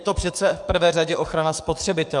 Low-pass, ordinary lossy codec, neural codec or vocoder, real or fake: 10.8 kHz; MP3, 96 kbps; codec, 44.1 kHz, 7.8 kbps, DAC; fake